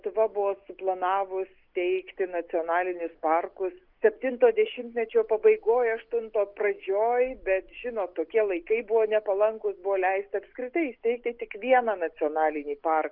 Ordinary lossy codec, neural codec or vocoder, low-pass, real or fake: Opus, 24 kbps; none; 5.4 kHz; real